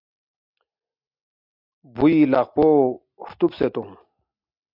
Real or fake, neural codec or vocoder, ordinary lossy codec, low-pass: real; none; MP3, 48 kbps; 5.4 kHz